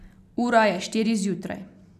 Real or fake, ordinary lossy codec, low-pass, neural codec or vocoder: real; none; 14.4 kHz; none